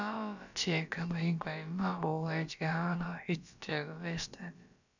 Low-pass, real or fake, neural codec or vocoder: 7.2 kHz; fake; codec, 16 kHz, about 1 kbps, DyCAST, with the encoder's durations